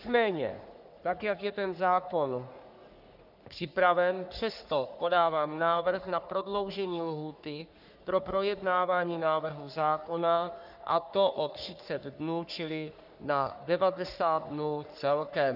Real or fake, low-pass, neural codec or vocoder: fake; 5.4 kHz; codec, 44.1 kHz, 3.4 kbps, Pupu-Codec